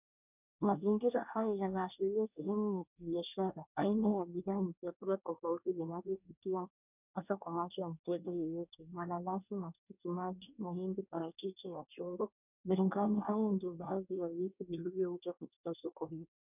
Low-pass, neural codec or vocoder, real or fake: 3.6 kHz; codec, 24 kHz, 1 kbps, SNAC; fake